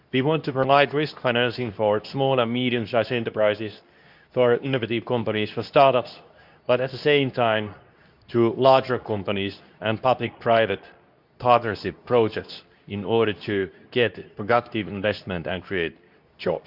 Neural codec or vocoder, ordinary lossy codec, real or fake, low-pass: codec, 24 kHz, 0.9 kbps, WavTokenizer, medium speech release version 2; none; fake; 5.4 kHz